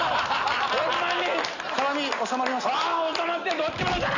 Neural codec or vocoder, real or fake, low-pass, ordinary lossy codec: none; real; 7.2 kHz; none